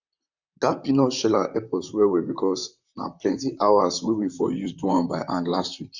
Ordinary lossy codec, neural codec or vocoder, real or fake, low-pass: none; vocoder, 44.1 kHz, 128 mel bands, Pupu-Vocoder; fake; 7.2 kHz